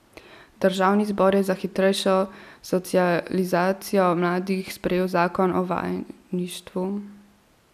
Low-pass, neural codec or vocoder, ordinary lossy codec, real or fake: 14.4 kHz; none; none; real